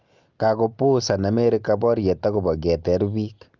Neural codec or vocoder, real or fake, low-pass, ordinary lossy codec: none; real; 7.2 kHz; Opus, 24 kbps